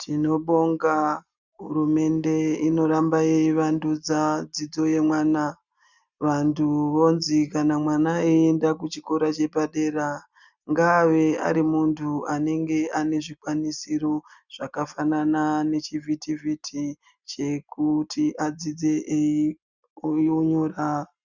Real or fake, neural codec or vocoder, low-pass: real; none; 7.2 kHz